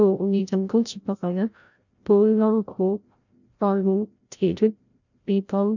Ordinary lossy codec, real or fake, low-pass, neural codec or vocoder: none; fake; 7.2 kHz; codec, 16 kHz, 0.5 kbps, FreqCodec, larger model